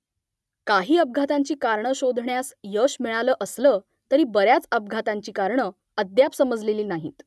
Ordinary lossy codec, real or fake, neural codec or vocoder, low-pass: none; real; none; none